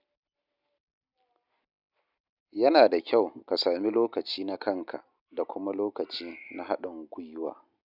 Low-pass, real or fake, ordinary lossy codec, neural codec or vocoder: 5.4 kHz; real; none; none